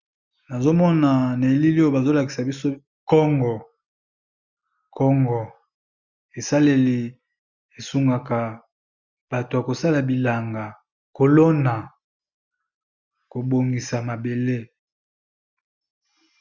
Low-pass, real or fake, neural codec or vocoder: 7.2 kHz; real; none